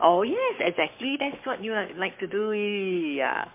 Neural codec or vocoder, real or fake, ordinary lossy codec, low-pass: codec, 44.1 kHz, 7.8 kbps, Pupu-Codec; fake; MP3, 24 kbps; 3.6 kHz